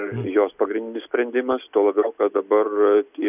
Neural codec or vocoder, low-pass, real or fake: none; 3.6 kHz; real